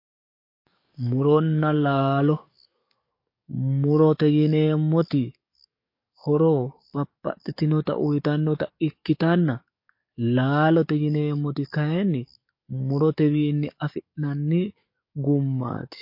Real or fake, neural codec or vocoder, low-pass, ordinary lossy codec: fake; codec, 44.1 kHz, 7.8 kbps, DAC; 5.4 kHz; MP3, 32 kbps